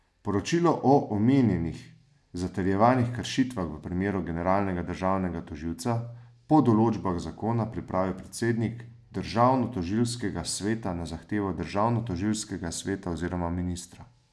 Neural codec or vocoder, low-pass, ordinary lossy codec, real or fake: none; none; none; real